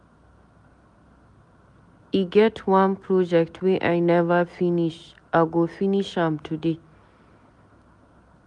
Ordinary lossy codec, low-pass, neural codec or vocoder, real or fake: none; 10.8 kHz; none; real